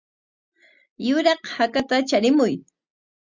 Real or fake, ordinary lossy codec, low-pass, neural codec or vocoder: real; Opus, 64 kbps; 7.2 kHz; none